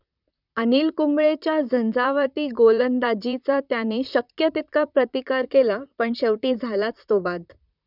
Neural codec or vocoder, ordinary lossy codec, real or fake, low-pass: vocoder, 44.1 kHz, 128 mel bands, Pupu-Vocoder; none; fake; 5.4 kHz